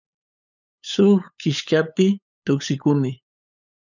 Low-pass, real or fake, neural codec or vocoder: 7.2 kHz; fake; codec, 16 kHz, 8 kbps, FunCodec, trained on LibriTTS, 25 frames a second